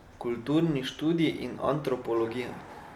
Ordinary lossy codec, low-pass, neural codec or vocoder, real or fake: none; 19.8 kHz; none; real